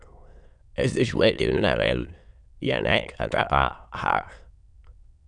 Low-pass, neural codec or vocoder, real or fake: 9.9 kHz; autoencoder, 22.05 kHz, a latent of 192 numbers a frame, VITS, trained on many speakers; fake